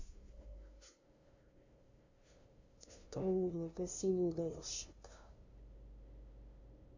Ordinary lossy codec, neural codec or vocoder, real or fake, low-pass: Opus, 64 kbps; codec, 16 kHz, 0.5 kbps, FunCodec, trained on LibriTTS, 25 frames a second; fake; 7.2 kHz